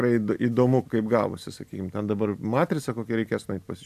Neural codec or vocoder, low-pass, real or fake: none; 14.4 kHz; real